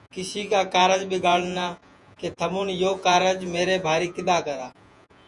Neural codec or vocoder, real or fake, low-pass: vocoder, 48 kHz, 128 mel bands, Vocos; fake; 10.8 kHz